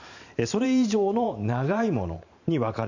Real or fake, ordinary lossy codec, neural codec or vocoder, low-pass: fake; none; vocoder, 44.1 kHz, 128 mel bands every 512 samples, BigVGAN v2; 7.2 kHz